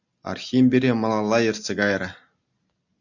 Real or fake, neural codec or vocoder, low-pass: real; none; 7.2 kHz